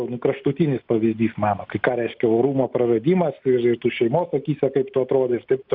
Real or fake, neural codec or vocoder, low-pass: real; none; 5.4 kHz